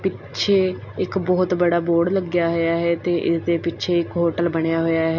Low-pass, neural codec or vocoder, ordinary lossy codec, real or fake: 7.2 kHz; none; none; real